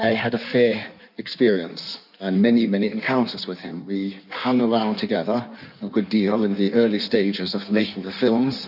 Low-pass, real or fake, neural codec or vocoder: 5.4 kHz; fake; codec, 16 kHz in and 24 kHz out, 1.1 kbps, FireRedTTS-2 codec